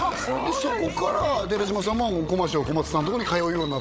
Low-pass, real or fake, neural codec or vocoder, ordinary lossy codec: none; fake; codec, 16 kHz, 16 kbps, FreqCodec, larger model; none